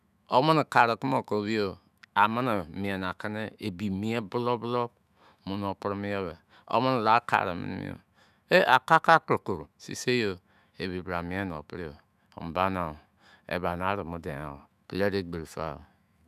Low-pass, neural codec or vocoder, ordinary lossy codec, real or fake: 14.4 kHz; autoencoder, 48 kHz, 128 numbers a frame, DAC-VAE, trained on Japanese speech; none; fake